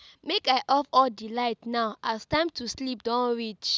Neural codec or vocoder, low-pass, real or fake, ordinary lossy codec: none; none; real; none